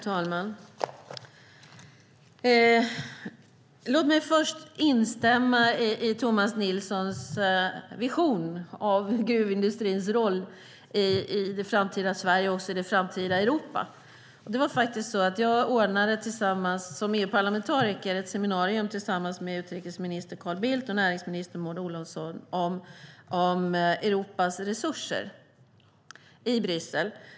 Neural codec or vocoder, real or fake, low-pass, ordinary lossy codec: none; real; none; none